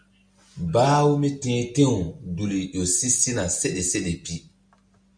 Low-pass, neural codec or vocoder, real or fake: 9.9 kHz; none; real